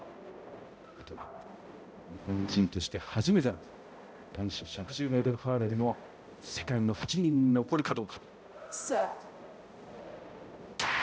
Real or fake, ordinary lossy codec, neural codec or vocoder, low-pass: fake; none; codec, 16 kHz, 0.5 kbps, X-Codec, HuBERT features, trained on balanced general audio; none